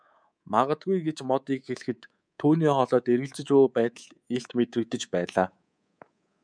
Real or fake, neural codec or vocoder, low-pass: fake; codec, 24 kHz, 3.1 kbps, DualCodec; 9.9 kHz